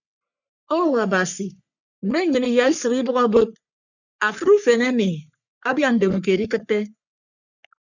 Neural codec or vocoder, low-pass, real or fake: codec, 44.1 kHz, 3.4 kbps, Pupu-Codec; 7.2 kHz; fake